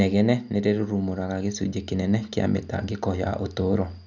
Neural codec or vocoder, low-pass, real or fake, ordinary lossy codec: none; 7.2 kHz; real; none